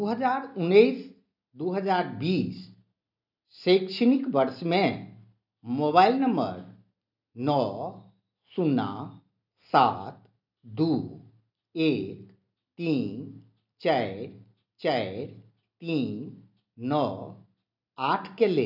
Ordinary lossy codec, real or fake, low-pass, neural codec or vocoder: none; real; 5.4 kHz; none